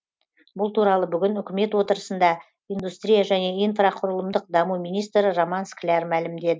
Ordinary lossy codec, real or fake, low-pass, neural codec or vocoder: none; real; none; none